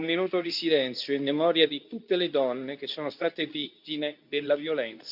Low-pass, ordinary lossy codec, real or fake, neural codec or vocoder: 5.4 kHz; none; fake; codec, 24 kHz, 0.9 kbps, WavTokenizer, medium speech release version 2